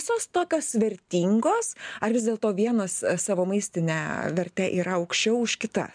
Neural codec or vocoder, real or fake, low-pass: none; real; 9.9 kHz